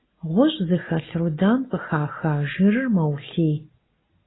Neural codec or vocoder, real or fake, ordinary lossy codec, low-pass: none; real; AAC, 16 kbps; 7.2 kHz